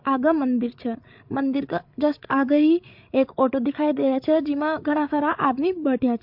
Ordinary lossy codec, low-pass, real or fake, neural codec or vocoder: MP3, 48 kbps; 5.4 kHz; fake; codec, 16 kHz, 8 kbps, FreqCodec, larger model